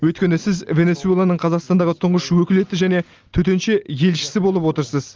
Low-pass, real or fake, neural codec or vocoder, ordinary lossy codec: 7.2 kHz; real; none; Opus, 32 kbps